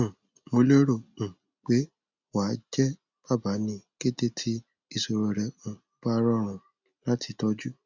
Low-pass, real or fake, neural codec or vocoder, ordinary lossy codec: 7.2 kHz; real; none; none